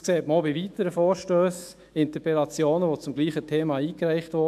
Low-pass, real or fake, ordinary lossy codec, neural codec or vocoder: 14.4 kHz; fake; AAC, 96 kbps; autoencoder, 48 kHz, 128 numbers a frame, DAC-VAE, trained on Japanese speech